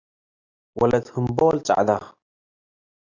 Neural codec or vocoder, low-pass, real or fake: none; 7.2 kHz; real